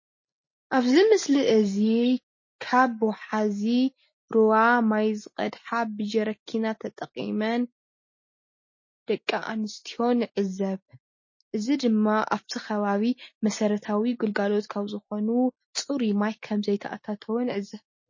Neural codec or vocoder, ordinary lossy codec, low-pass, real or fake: none; MP3, 32 kbps; 7.2 kHz; real